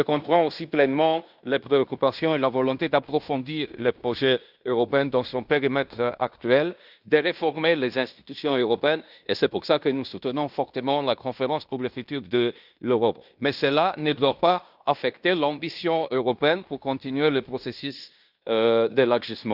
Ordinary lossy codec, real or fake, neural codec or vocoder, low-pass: Opus, 64 kbps; fake; codec, 16 kHz in and 24 kHz out, 0.9 kbps, LongCat-Audio-Codec, fine tuned four codebook decoder; 5.4 kHz